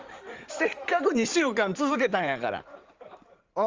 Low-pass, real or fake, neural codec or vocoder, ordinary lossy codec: 7.2 kHz; fake; codec, 16 kHz in and 24 kHz out, 2.2 kbps, FireRedTTS-2 codec; Opus, 32 kbps